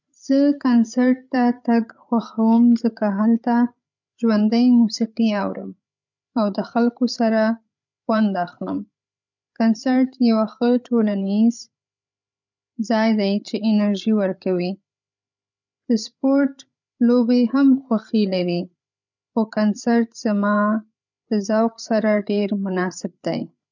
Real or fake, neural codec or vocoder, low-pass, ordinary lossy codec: fake; codec, 16 kHz, 8 kbps, FreqCodec, larger model; 7.2 kHz; none